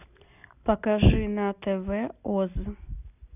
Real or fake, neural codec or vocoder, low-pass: real; none; 3.6 kHz